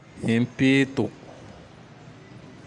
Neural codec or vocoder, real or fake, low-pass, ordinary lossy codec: none; real; 10.8 kHz; none